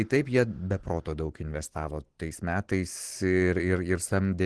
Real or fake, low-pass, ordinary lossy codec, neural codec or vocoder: real; 10.8 kHz; Opus, 16 kbps; none